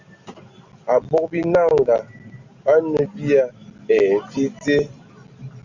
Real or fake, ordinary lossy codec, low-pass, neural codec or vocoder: real; Opus, 64 kbps; 7.2 kHz; none